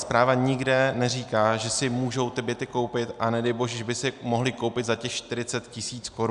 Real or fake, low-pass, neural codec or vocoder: real; 10.8 kHz; none